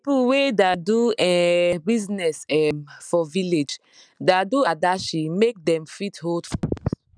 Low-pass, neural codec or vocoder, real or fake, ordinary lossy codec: 9.9 kHz; none; real; none